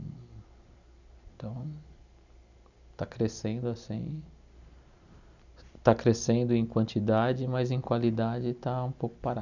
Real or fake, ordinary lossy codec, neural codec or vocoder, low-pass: real; none; none; 7.2 kHz